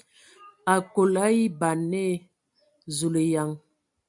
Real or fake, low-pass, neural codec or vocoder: real; 10.8 kHz; none